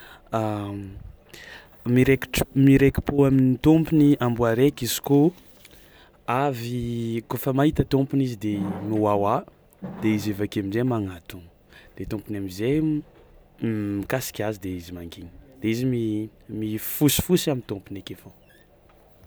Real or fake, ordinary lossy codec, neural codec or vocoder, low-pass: real; none; none; none